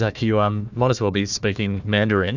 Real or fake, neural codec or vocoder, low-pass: fake; codec, 16 kHz, 1 kbps, FunCodec, trained on Chinese and English, 50 frames a second; 7.2 kHz